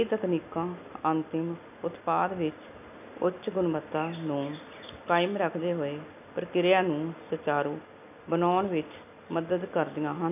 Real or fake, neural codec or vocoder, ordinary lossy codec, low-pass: real; none; none; 3.6 kHz